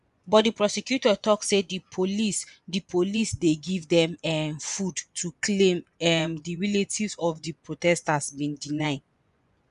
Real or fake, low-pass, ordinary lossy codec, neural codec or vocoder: fake; 10.8 kHz; MP3, 96 kbps; vocoder, 24 kHz, 100 mel bands, Vocos